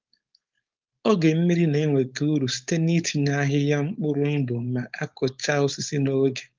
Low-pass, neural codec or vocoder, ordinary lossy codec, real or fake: 7.2 kHz; codec, 16 kHz, 4.8 kbps, FACodec; Opus, 32 kbps; fake